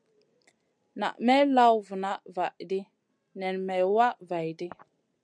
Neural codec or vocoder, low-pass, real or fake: none; 9.9 kHz; real